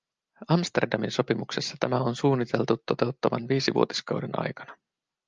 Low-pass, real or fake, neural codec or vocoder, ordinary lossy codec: 7.2 kHz; real; none; Opus, 24 kbps